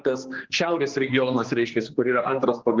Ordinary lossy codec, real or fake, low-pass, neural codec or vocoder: Opus, 16 kbps; fake; 7.2 kHz; codec, 16 kHz, 2 kbps, X-Codec, HuBERT features, trained on general audio